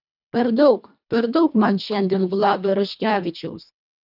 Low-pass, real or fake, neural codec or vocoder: 5.4 kHz; fake; codec, 24 kHz, 1.5 kbps, HILCodec